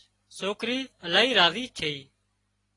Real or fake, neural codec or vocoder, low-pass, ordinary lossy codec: real; none; 10.8 kHz; AAC, 32 kbps